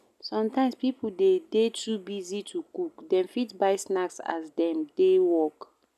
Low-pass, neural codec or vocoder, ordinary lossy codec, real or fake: 14.4 kHz; none; none; real